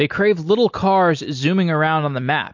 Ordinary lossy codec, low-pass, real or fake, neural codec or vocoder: MP3, 48 kbps; 7.2 kHz; real; none